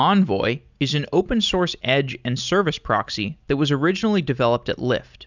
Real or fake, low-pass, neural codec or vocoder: real; 7.2 kHz; none